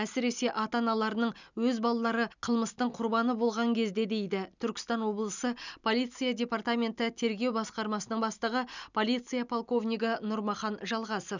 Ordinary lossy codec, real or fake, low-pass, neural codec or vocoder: none; real; 7.2 kHz; none